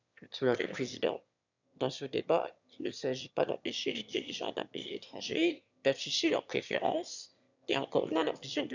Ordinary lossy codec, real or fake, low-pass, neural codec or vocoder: none; fake; 7.2 kHz; autoencoder, 22.05 kHz, a latent of 192 numbers a frame, VITS, trained on one speaker